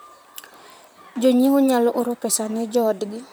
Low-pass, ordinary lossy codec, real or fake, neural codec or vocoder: none; none; fake; vocoder, 44.1 kHz, 128 mel bands, Pupu-Vocoder